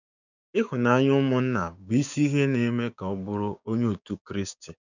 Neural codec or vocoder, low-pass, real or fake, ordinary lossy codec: codec, 16 kHz, 6 kbps, DAC; 7.2 kHz; fake; none